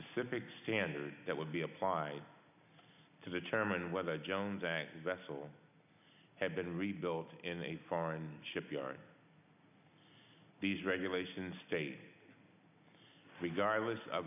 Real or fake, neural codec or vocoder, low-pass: real; none; 3.6 kHz